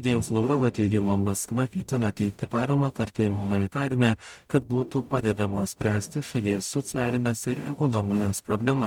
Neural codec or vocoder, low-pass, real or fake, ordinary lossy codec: codec, 44.1 kHz, 0.9 kbps, DAC; 19.8 kHz; fake; MP3, 96 kbps